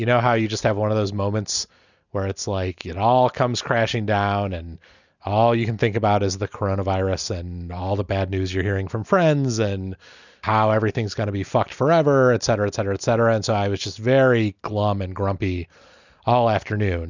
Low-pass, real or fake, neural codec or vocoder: 7.2 kHz; real; none